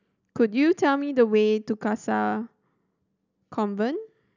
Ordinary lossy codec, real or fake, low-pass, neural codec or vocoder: none; real; 7.2 kHz; none